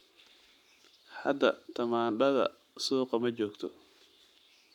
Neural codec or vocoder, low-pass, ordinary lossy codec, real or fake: codec, 44.1 kHz, 7.8 kbps, Pupu-Codec; 19.8 kHz; none; fake